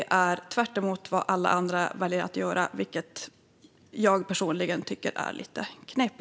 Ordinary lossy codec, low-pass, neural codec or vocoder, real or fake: none; none; none; real